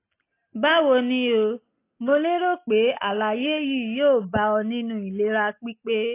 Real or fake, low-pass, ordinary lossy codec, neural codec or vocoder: real; 3.6 kHz; AAC, 24 kbps; none